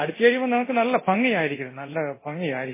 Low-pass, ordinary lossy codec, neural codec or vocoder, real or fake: 3.6 kHz; MP3, 16 kbps; codec, 24 kHz, 0.9 kbps, DualCodec; fake